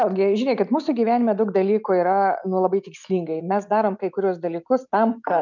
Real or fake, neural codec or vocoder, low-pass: real; none; 7.2 kHz